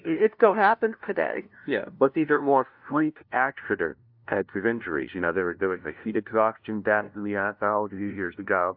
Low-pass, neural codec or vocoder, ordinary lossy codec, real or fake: 5.4 kHz; codec, 16 kHz, 0.5 kbps, FunCodec, trained on LibriTTS, 25 frames a second; AAC, 48 kbps; fake